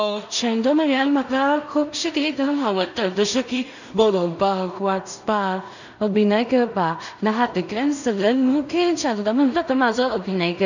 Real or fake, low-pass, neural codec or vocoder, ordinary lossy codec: fake; 7.2 kHz; codec, 16 kHz in and 24 kHz out, 0.4 kbps, LongCat-Audio-Codec, two codebook decoder; none